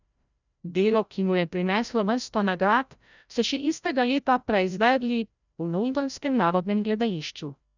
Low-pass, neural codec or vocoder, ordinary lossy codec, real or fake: 7.2 kHz; codec, 16 kHz, 0.5 kbps, FreqCodec, larger model; none; fake